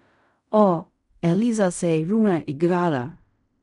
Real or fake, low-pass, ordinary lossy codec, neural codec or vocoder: fake; 10.8 kHz; none; codec, 16 kHz in and 24 kHz out, 0.4 kbps, LongCat-Audio-Codec, fine tuned four codebook decoder